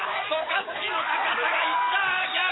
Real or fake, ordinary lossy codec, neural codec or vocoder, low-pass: fake; AAC, 16 kbps; codec, 44.1 kHz, 7.8 kbps, DAC; 7.2 kHz